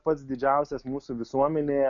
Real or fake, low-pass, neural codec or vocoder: real; 7.2 kHz; none